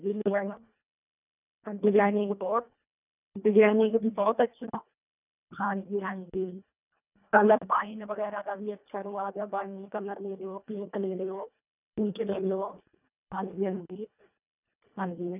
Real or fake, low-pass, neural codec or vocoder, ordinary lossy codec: fake; 3.6 kHz; codec, 24 kHz, 1.5 kbps, HILCodec; none